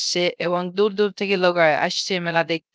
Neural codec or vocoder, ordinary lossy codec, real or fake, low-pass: codec, 16 kHz, 0.3 kbps, FocalCodec; none; fake; none